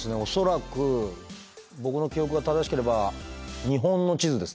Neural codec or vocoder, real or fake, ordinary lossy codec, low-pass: none; real; none; none